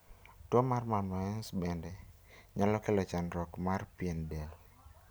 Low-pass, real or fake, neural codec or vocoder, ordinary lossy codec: none; real; none; none